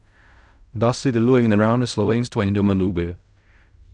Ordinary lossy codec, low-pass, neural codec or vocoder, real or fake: none; 10.8 kHz; codec, 16 kHz in and 24 kHz out, 0.4 kbps, LongCat-Audio-Codec, fine tuned four codebook decoder; fake